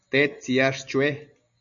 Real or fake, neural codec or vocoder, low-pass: real; none; 7.2 kHz